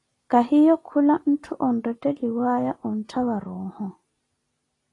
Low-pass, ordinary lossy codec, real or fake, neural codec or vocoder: 10.8 kHz; AAC, 32 kbps; real; none